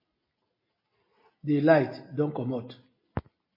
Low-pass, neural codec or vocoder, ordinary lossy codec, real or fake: 5.4 kHz; none; MP3, 24 kbps; real